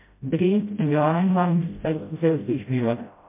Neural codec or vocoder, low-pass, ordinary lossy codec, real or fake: codec, 16 kHz, 0.5 kbps, FreqCodec, smaller model; 3.6 kHz; MP3, 24 kbps; fake